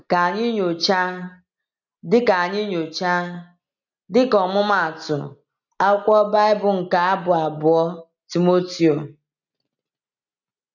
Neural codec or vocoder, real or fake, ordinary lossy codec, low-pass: none; real; none; 7.2 kHz